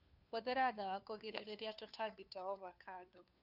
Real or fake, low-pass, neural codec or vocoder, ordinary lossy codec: fake; 5.4 kHz; codec, 16 kHz, 2 kbps, FunCodec, trained on Chinese and English, 25 frames a second; none